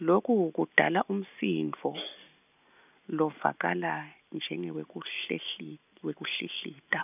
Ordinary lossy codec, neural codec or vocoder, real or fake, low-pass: none; none; real; 3.6 kHz